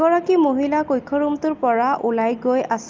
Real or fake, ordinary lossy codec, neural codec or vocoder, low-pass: real; Opus, 32 kbps; none; 7.2 kHz